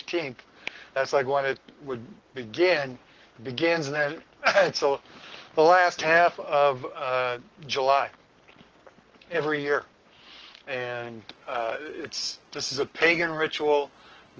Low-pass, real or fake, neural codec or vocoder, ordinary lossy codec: 7.2 kHz; fake; codec, 44.1 kHz, 7.8 kbps, Pupu-Codec; Opus, 16 kbps